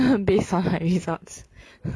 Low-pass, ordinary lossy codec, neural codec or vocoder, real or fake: none; none; none; real